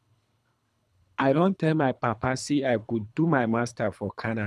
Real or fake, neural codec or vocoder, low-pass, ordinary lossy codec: fake; codec, 24 kHz, 3 kbps, HILCodec; none; none